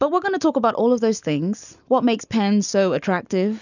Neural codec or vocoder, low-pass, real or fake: none; 7.2 kHz; real